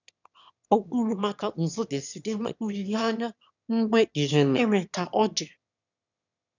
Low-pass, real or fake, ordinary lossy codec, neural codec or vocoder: 7.2 kHz; fake; none; autoencoder, 22.05 kHz, a latent of 192 numbers a frame, VITS, trained on one speaker